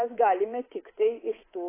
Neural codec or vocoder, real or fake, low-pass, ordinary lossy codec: codec, 24 kHz, 3.1 kbps, DualCodec; fake; 3.6 kHz; AAC, 16 kbps